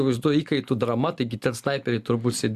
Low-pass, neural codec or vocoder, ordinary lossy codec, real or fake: 14.4 kHz; none; Opus, 64 kbps; real